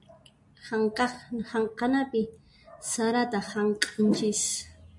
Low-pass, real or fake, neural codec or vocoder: 10.8 kHz; real; none